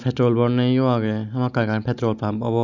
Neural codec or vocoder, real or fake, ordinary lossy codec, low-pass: none; real; none; 7.2 kHz